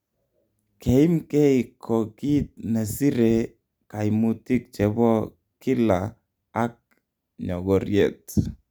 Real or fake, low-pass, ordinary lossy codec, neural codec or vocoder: real; none; none; none